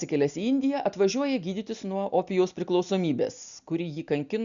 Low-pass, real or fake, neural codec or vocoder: 7.2 kHz; real; none